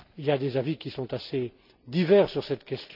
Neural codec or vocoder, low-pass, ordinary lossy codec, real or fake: none; 5.4 kHz; none; real